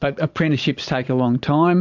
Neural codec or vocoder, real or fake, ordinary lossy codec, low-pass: vocoder, 22.05 kHz, 80 mel bands, Vocos; fake; MP3, 64 kbps; 7.2 kHz